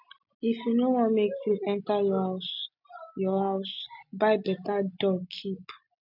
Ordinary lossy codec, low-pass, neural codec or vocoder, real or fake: none; 5.4 kHz; none; real